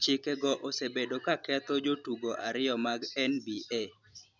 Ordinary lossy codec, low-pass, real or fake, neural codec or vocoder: none; 7.2 kHz; real; none